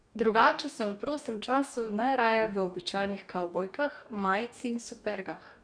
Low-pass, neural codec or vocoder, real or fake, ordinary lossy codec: 9.9 kHz; codec, 44.1 kHz, 2.6 kbps, DAC; fake; none